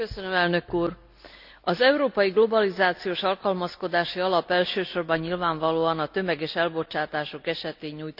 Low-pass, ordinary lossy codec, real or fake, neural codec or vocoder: 5.4 kHz; none; real; none